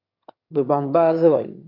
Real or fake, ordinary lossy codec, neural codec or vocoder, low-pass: fake; AAC, 32 kbps; autoencoder, 22.05 kHz, a latent of 192 numbers a frame, VITS, trained on one speaker; 5.4 kHz